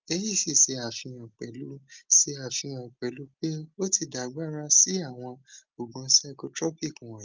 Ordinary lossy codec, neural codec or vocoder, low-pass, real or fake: Opus, 32 kbps; none; 7.2 kHz; real